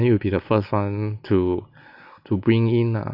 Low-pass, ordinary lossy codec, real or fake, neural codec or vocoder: 5.4 kHz; AAC, 48 kbps; real; none